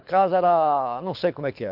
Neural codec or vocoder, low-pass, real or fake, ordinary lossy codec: codec, 24 kHz, 6 kbps, HILCodec; 5.4 kHz; fake; MP3, 48 kbps